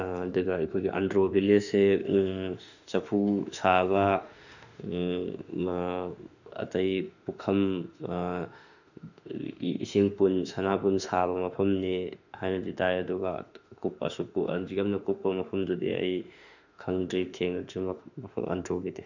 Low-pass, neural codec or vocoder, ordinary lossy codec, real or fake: 7.2 kHz; autoencoder, 48 kHz, 32 numbers a frame, DAC-VAE, trained on Japanese speech; none; fake